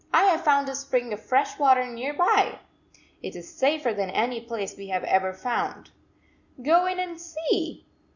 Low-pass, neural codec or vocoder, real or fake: 7.2 kHz; none; real